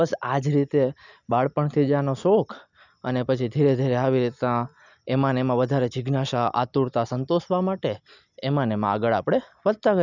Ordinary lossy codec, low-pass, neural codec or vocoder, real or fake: none; 7.2 kHz; none; real